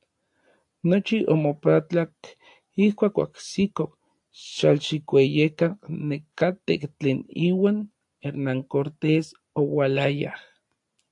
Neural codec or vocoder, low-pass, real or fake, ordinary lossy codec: none; 10.8 kHz; real; AAC, 64 kbps